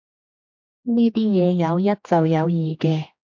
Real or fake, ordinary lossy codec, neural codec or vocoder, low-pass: fake; MP3, 64 kbps; codec, 16 kHz, 2 kbps, X-Codec, HuBERT features, trained on general audio; 7.2 kHz